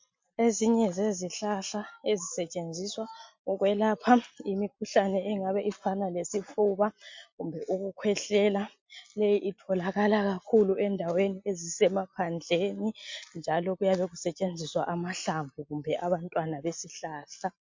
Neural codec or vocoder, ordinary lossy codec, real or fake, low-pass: none; MP3, 48 kbps; real; 7.2 kHz